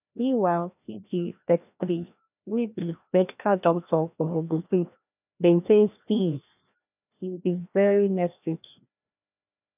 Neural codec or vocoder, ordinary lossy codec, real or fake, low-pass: codec, 16 kHz, 1 kbps, FreqCodec, larger model; none; fake; 3.6 kHz